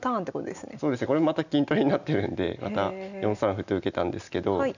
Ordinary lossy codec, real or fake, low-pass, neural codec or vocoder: none; real; 7.2 kHz; none